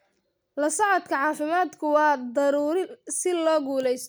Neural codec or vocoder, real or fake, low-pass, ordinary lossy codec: none; real; none; none